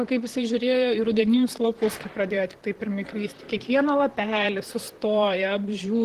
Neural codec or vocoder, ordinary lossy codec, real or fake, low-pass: vocoder, 44.1 kHz, 128 mel bands, Pupu-Vocoder; Opus, 16 kbps; fake; 14.4 kHz